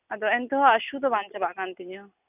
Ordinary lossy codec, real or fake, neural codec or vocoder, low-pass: none; real; none; 3.6 kHz